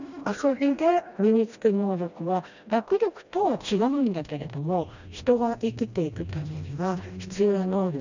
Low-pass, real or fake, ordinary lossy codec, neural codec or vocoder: 7.2 kHz; fake; none; codec, 16 kHz, 1 kbps, FreqCodec, smaller model